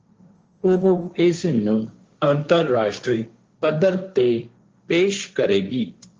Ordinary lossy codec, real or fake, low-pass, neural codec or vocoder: Opus, 24 kbps; fake; 7.2 kHz; codec, 16 kHz, 1.1 kbps, Voila-Tokenizer